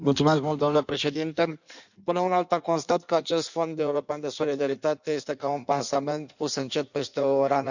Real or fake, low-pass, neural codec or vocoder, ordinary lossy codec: fake; 7.2 kHz; codec, 16 kHz in and 24 kHz out, 1.1 kbps, FireRedTTS-2 codec; none